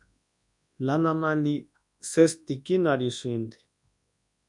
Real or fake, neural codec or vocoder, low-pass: fake; codec, 24 kHz, 0.9 kbps, WavTokenizer, large speech release; 10.8 kHz